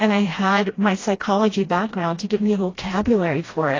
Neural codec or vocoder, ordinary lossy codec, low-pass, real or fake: codec, 16 kHz, 1 kbps, FreqCodec, smaller model; AAC, 32 kbps; 7.2 kHz; fake